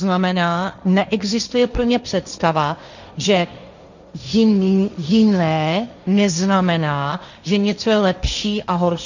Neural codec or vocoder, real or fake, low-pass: codec, 16 kHz, 1.1 kbps, Voila-Tokenizer; fake; 7.2 kHz